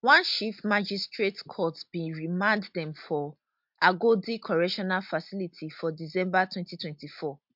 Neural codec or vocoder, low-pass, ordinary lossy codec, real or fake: none; 5.4 kHz; MP3, 48 kbps; real